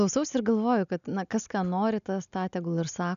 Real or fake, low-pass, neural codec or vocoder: real; 7.2 kHz; none